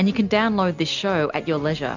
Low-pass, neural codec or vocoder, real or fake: 7.2 kHz; none; real